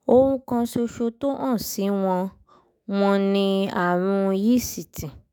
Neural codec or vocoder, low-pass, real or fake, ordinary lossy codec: autoencoder, 48 kHz, 128 numbers a frame, DAC-VAE, trained on Japanese speech; none; fake; none